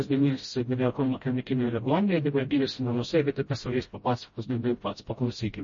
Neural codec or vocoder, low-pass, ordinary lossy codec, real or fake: codec, 16 kHz, 0.5 kbps, FreqCodec, smaller model; 7.2 kHz; MP3, 32 kbps; fake